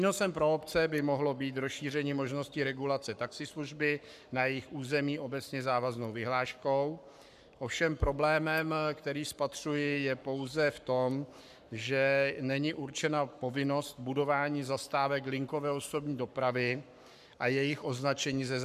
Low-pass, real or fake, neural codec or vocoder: 14.4 kHz; fake; codec, 44.1 kHz, 7.8 kbps, Pupu-Codec